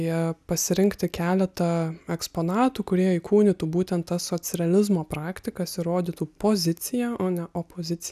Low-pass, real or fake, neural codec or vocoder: 14.4 kHz; real; none